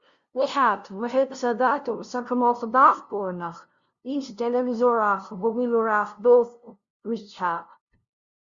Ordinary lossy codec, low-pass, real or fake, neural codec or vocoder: Opus, 64 kbps; 7.2 kHz; fake; codec, 16 kHz, 0.5 kbps, FunCodec, trained on LibriTTS, 25 frames a second